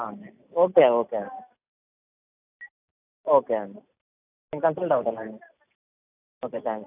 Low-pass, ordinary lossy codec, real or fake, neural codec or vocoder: 3.6 kHz; AAC, 32 kbps; real; none